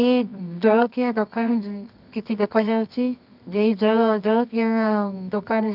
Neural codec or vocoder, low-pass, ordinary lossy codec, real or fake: codec, 24 kHz, 0.9 kbps, WavTokenizer, medium music audio release; 5.4 kHz; none; fake